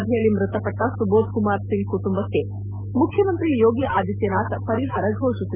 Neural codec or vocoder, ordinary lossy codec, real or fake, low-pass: autoencoder, 48 kHz, 128 numbers a frame, DAC-VAE, trained on Japanese speech; none; fake; 3.6 kHz